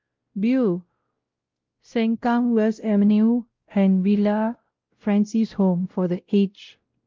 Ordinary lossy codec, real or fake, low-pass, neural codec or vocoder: Opus, 32 kbps; fake; 7.2 kHz; codec, 16 kHz, 0.5 kbps, X-Codec, WavLM features, trained on Multilingual LibriSpeech